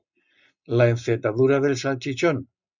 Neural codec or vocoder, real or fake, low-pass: none; real; 7.2 kHz